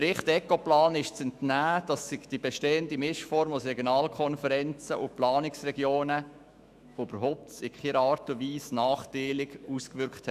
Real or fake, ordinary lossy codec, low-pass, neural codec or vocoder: fake; none; 14.4 kHz; autoencoder, 48 kHz, 128 numbers a frame, DAC-VAE, trained on Japanese speech